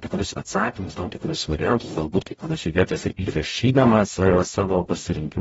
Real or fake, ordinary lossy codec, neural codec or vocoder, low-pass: fake; AAC, 24 kbps; codec, 44.1 kHz, 0.9 kbps, DAC; 19.8 kHz